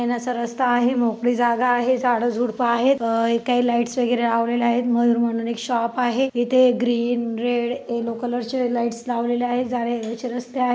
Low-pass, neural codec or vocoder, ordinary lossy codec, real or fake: none; none; none; real